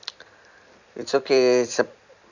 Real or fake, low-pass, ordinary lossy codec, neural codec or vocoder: fake; 7.2 kHz; none; vocoder, 44.1 kHz, 128 mel bands every 256 samples, BigVGAN v2